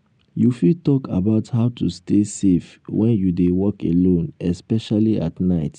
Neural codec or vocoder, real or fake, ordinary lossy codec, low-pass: none; real; none; 9.9 kHz